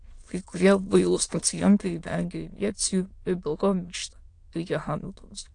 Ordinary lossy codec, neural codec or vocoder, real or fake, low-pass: AAC, 48 kbps; autoencoder, 22.05 kHz, a latent of 192 numbers a frame, VITS, trained on many speakers; fake; 9.9 kHz